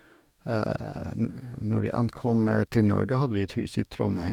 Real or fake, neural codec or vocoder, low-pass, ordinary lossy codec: fake; codec, 44.1 kHz, 2.6 kbps, DAC; 19.8 kHz; none